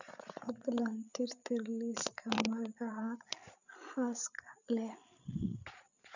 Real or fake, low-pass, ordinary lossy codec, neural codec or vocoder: fake; 7.2 kHz; none; codec, 16 kHz, 8 kbps, FreqCodec, larger model